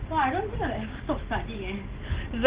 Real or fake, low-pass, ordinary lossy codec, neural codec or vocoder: real; 3.6 kHz; Opus, 16 kbps; none